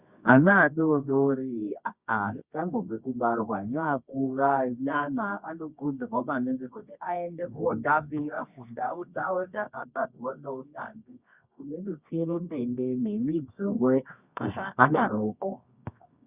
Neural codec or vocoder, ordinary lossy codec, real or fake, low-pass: codec, 24 kHz, 0.9 kbps, WavTokenizer, medium music audio release; Opus, 64 kbps; fake; 3.6 kHz